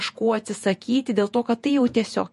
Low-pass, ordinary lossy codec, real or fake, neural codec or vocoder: 14.4 kHz; MP3, 48 kbps; fake; vocoder, 48 kHz, 128 mel bands, Vocos